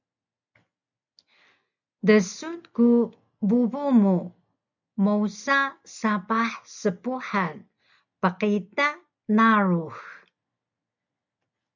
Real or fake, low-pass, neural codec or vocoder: real; 7.2 kHz; none